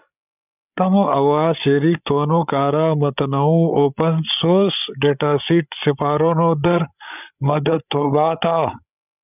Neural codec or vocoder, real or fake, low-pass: codec, 16 kHz, 8 kbps, FreqCodec, larger model; fake; 3.6 kHz